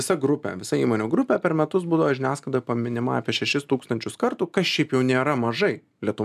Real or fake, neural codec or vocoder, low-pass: real; none; 14.4 kHz